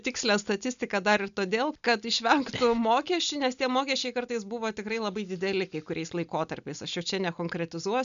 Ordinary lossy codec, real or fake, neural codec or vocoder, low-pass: MP3, 96 kbps; real; none; 7.2 kHz